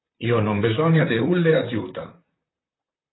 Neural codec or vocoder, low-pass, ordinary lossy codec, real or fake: vocoder, 44.1 kHz, 128 mel bands, Pupu-Vocoder; 7.2 kHz; AAC, 16 kbps; fake